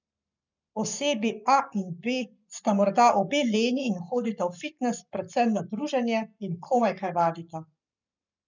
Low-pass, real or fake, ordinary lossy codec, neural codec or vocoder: 7.2 kHz; fake; none; codec, 44.1 kHz, 7.8 kbps, Pupu-Codec